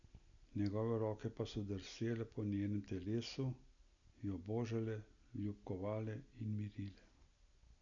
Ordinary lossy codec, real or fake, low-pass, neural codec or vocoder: none; real; 7.2 kHz; none